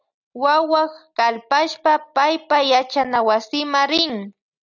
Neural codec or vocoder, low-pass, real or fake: none; 7.2 kHz; real